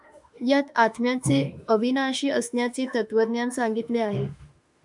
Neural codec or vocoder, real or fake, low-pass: autoencoder, 48 kHz, 32 numbers a frame, DAC-VAE, trained on Japanese speech; fake; 10.8 kHz